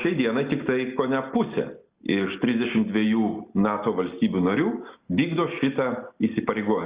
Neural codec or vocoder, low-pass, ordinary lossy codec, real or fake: none; 3.6 kHz; Opus, 64 kbps; real